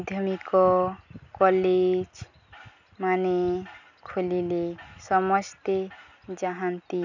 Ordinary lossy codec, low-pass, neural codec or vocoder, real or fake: none; 7.2 kHz; none; real